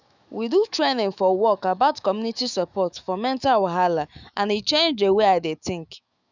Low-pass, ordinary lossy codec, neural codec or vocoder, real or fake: 7.2 kHz; none; autoencoder, 48 kHz, 128 numbers a frame, DAC-VAE, trained on Japanese speech; fake